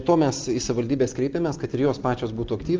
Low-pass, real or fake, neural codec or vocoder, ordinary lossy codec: 7.2 kHz; real; none; Opus, 24 kbps